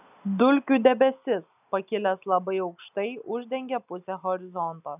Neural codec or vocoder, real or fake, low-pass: none; real; 3.6 kHz